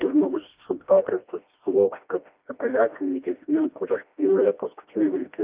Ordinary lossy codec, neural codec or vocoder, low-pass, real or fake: Opus, 24 kbps; codec, 16 kHz, 1 kbps, FreqCodec, smaller model; 3.6 kHz; fake